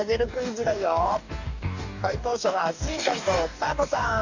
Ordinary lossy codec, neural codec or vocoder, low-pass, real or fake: none; codec, 44.1 kHz, 2.6 kbps, DAC; 7.2 kHz; fake